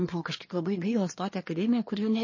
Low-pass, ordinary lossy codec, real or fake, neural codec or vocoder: 7.2 kHz; MP3, 32 kbps; fake; codec, 24 kHz, 1 kbps, SNAC